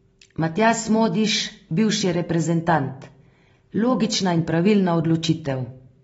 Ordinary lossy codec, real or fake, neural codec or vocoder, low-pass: AAC, 24 kbps; real; none; 19.8 kHz